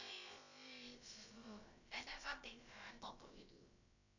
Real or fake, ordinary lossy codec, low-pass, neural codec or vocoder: fake; none; 7.2 kHz; codec, 16 kHz, about 1 kbps, DyCAST, with the encoder's durations